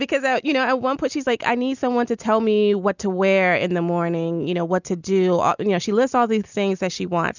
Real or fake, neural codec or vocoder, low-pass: real; none; 7.2 kHz